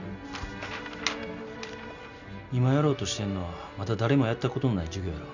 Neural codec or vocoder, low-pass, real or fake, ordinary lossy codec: none; 7.2 kHz; real; none